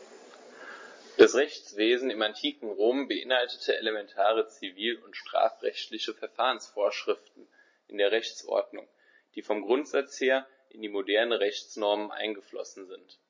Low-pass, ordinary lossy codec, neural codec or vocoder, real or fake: 7.2 kHz; MP3, 32 kbps; none; real